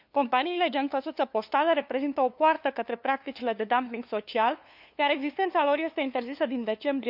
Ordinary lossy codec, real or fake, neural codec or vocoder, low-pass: none; fake; codec, 16 kHz, 2 kbps, FunCodec, trained on LibriTTS, 25 frames a second; 5.4 kHz